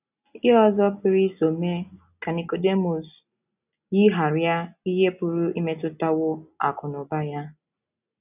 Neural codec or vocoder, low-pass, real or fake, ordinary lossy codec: none; 3.6 kHz; real; none